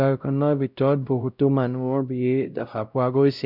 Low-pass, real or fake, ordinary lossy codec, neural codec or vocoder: 5.4 kHz; fake; none; codec, 16 kHz, 0.5 kbps, X-Codec, WavLM features, trained on Multilingual LibriSpeech